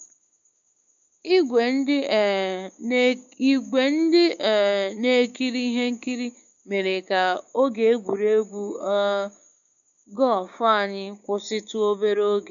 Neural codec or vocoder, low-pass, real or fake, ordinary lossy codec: codec, 16 kHz, 6 kbps, DAC; 7.2 kHz; fake; none